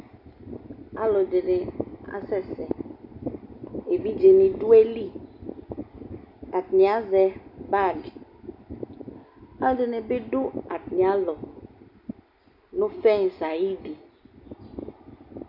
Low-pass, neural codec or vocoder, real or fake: 5.4 kHz; none; real